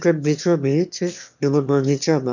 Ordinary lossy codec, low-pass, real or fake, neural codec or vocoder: none; 7.2 kHz; fake; autoencoder, 22.05 kHz, a latent of 192 numbers a frame, VITS, trained on one speaker